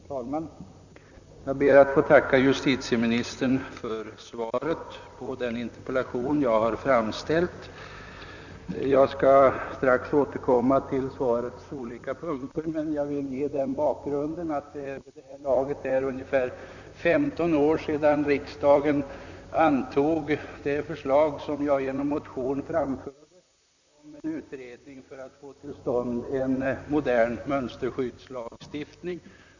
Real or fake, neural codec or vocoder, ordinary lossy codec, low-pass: fake; vocoder, 44.1 kHz, 128 mel bands, Pupu-Vocoder; none; 7.2 kHz